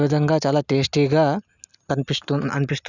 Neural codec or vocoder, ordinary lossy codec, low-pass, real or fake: none; none; 7.2 kHz; real